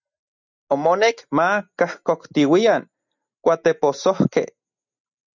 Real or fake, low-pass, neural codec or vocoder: real; 7.2 kHz; none